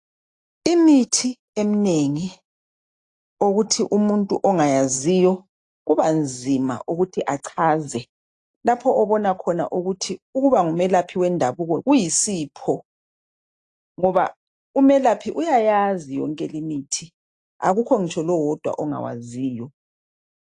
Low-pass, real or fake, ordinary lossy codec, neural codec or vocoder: 10.8 kHz; real; AAC, 48 kbps; none